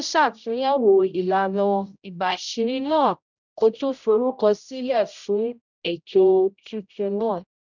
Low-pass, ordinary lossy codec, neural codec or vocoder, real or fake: 7.2 kHz; none; codec, 16 kHz, 0.5 kbps, X-Codec, HuBERT features, trained on general audio; fake